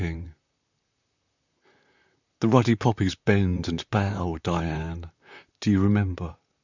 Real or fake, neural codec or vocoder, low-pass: fake; vocoder, 44.1 kHz, 128 mel bands, Pupu-Vocoder; 7.2 kHz